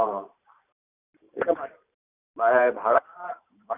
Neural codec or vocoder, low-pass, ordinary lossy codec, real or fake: vocoder, 44.1 kHz, 128 mel bands every 512 samples, BigVGAN v2; 3.6 kHz; none; fake